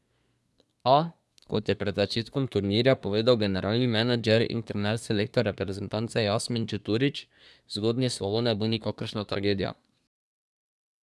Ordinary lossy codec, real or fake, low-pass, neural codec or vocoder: none; fake; none; codec, 24 kHz, 1 kbps, SNAC